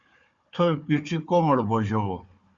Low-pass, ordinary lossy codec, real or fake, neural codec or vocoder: 7.2 kHz; Opus, 64 kbps; fake; codec, 16 kHz, 4 kbps, FunCodec, trained on Chinese and English, 50 frames a second